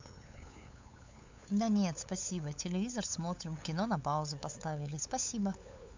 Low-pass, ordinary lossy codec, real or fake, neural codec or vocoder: 7.2 kHz; MP3, 64 kbps; fake; codec, 16 kHz, 8 kbps, FunCodec, trained on LibriTTS, 25 frames a second